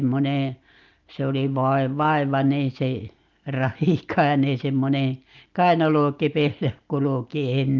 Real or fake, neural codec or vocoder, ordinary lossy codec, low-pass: real; none; Opus, 32 kbps; 7.2 kHz